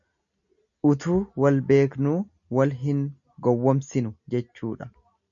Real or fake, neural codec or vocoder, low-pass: real; none; 7.2 kHz